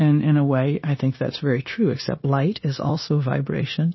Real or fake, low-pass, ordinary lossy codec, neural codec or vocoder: fake; 7.2 kHz; MP3, 24 kbps; codec, 16 kHz, 0.9 kbps, LongCat-Audio-Codec